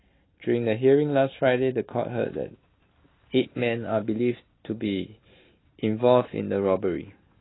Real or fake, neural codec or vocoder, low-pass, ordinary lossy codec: real; none; 7.2 kHz; AAC, 16 kbps